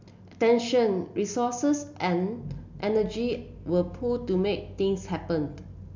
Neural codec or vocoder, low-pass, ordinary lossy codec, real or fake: none; 7.2 kHz; MP3, 64 kbps; real